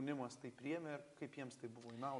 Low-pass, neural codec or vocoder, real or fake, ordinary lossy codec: 10.8 kHz; none; real; MP3, 64 kbps